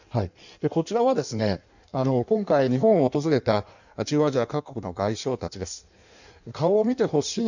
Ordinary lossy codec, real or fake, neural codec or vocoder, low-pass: none; fake; codec, 16 kHz in and 24 kHz out, 1.1 kbps, FireRedTTS-2 codec; 7.2 kHz